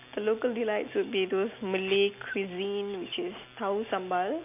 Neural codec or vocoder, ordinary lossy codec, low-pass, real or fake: none; none; 3.6 kHz; real